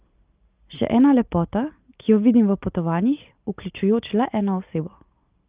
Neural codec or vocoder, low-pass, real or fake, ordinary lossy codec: none; 3.6 kHz; real; Opus, 24 kbps